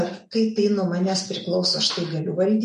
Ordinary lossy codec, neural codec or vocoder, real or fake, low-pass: MP3, 48 kbps; none; real; 10.8 kHz